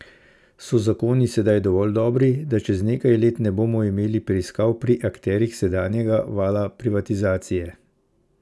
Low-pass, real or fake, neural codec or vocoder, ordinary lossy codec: none; real; none; none